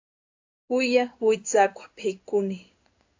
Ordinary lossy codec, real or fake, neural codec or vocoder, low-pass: AAC, 48 kbps; fake; codec, 16 kHz in and 24 kHz out, 1 kbps, XY-Tokenizer; 7.2 kHz